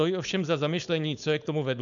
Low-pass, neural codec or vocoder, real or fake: 7.2 kHz; codec, 16 kHz, 4.8 kbps, FACodec; fake